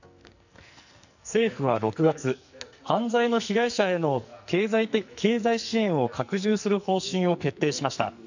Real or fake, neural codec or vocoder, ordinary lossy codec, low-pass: fake; codec, 44.1 kHz, 2.6 kbps, SNAC; none; 7.2 kHz